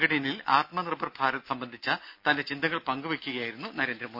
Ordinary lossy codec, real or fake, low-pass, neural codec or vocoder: none; real; 5.4 kHz; none